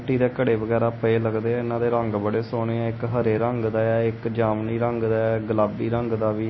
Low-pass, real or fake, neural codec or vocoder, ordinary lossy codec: 7.2 kHz; real; none; MP3, 24 kbps